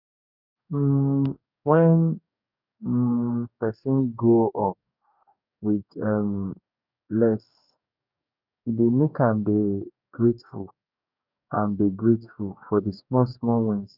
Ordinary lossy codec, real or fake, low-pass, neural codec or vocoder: none; fake; 5.4 kHz; codec, 44.1 kHz, 2.6 kbps, DAC